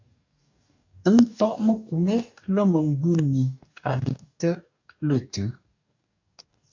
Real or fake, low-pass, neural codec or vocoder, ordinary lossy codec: fake; 7.2 kHz; codec, 44.1 kHz, 2.6 kbps, DAC; AAC, 48 kbps